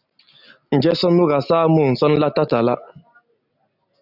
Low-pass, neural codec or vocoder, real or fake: 5.4 kHz; none; real